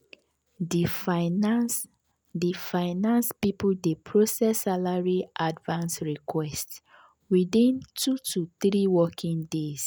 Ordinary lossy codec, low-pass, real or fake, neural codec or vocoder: none; none; real; none